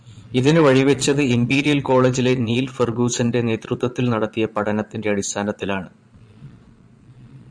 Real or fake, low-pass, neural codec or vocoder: fake; 9.9 kHz; vocoder, 22.05 kHz, 80 mel bands, Vocos